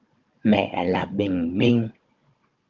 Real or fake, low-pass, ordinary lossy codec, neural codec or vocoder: fake; 7.2 kHz; Opus, 32 kbps; codec, 16 kHz, 16 kbps, FunCodec, trained on Chinese and English, 50 frames a second